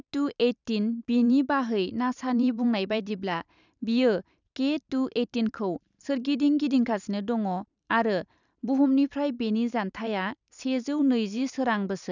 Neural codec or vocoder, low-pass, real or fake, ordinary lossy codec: vocoder, 44.1 kHz, 128 mel bands every 512 samples, BigVGAN v2; 7.2 kHz; fake; none